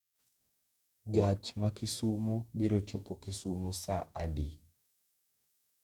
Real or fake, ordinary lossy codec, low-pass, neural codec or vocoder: fake; none; 19.8 kHz; codec, 44.1 kHz, 2.6 kbps, DAC